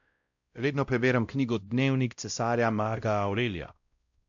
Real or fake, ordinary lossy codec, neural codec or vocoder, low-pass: fake; AAC, 64 kbps; codec, 16 kHz, 0.5 kbps, X-Codec, WavLM features, trained on Multilingual LibriSpeech; 7.2 kHz